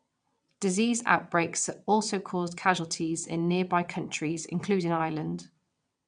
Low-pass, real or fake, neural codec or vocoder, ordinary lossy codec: 9.9 kHz; fake; vocoder, 22.05 kHz, 80 mel bands, WaveNeXt; MP3, 96 kbps